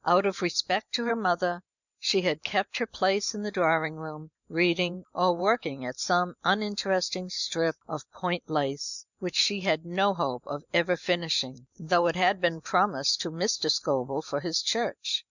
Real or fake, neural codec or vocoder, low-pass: fake; vocoder, 44.1 kHz, 128 mel bands every 512 samples, BigVGAN v2; 7.2 kHz